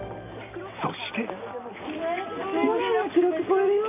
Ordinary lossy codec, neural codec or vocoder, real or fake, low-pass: none; none; real; 3.6 kHz